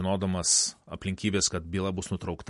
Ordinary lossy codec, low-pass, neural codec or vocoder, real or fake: MP3, 48 kbps; 14.4 kHz; none; real